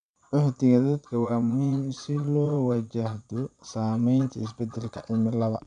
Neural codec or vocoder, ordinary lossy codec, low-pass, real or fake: vocoder, 22.05 kHz, 80 mel bands, Vocos; none; 9.9 kHz; fake